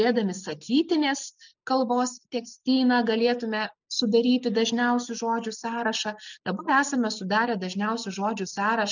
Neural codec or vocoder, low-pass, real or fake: none; 7.2 kHz; real